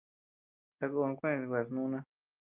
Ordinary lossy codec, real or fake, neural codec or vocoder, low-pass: Opus, 24 kbps; real; none; 3.6 kHz